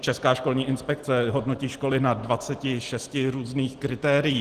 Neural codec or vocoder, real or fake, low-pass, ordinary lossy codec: none; real; 14.4 kHz; Opus, 16 kbps